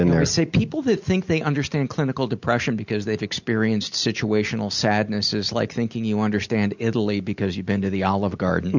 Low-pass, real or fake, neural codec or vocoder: 7.2 kHz; real; none